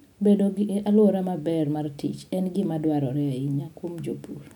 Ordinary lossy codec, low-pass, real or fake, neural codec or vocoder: none; 19.8 kHz; real; none